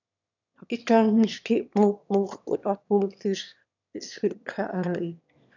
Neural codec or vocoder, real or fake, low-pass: autoencoder, 22.05 kHz, a latent of 192 numbers a frame, VITS, trained on one speaker; fake; 7.2 kHz